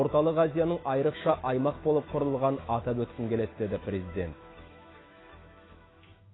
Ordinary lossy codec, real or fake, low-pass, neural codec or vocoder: AAC, 16 kbps; fake; 7.2 kHz; autoencoder, 48 kHz, 128 numbers a frame, DAC-VAE, trained on Japanese speech